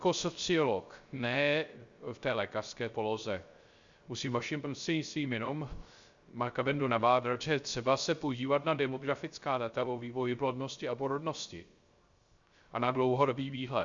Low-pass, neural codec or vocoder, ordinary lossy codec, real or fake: 7.2 kHz; codec, 16 kHz, 0.3 kbps, FocalCodec; Opus, 64 kbps; fake